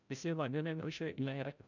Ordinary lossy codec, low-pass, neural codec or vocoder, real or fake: none; 7.2 kHz; codec, 16 kHz, 0.5 kbps, FreqCodec, larger model; fake